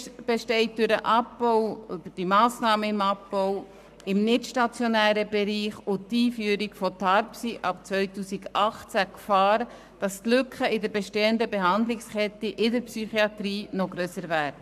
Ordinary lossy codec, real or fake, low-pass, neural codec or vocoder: none; fake; 14.4 kHz; codec, 44.1 kHz, 7.8 kbps, Pupu-Codec